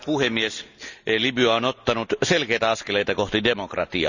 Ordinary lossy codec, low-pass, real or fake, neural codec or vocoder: none; 7.2 kHz; real; none